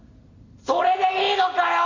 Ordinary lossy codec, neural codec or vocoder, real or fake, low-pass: Opus, 64 kbps; none; real; 7.2 kHz